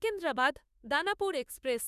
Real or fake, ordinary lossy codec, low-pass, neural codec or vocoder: fake; none; 14.4 kHz; autoencoder, 48 kHz, 32 numbers a frame, DAC-VAE, trained on Japanese speech